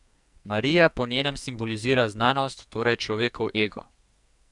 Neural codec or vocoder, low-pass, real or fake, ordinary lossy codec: codec, 44.1 kHz, 2.6 kbps, SNAC; 10.8 kHz; fake; none